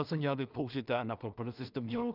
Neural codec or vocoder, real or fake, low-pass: codec, 16 kHz in and 24 kHz out, 0.4 kbps, LongCat-Audio-Codec, two codebook decoder; fake; 5.4 kHz